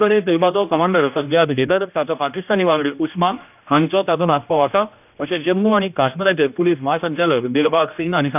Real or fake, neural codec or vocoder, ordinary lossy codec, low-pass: fake; codec, 16 kHz, 1 kbps, X-Codec, HuBERT features, trained on general audio; none; 3.6 kHz